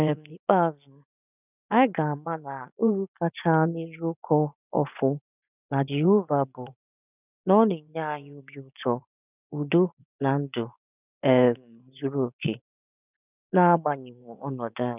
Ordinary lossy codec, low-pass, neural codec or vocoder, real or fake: none; 3.6 kHz; vocoder, 24 kHz, 100 mel bands, Vocos; fake